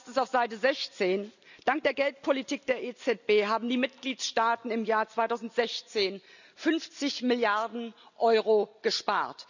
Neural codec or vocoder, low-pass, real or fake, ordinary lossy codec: none; 7.2 kHz; real; none